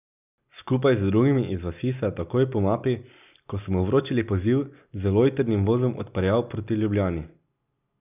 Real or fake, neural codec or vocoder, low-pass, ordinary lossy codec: real; none; 3.6 kHz; none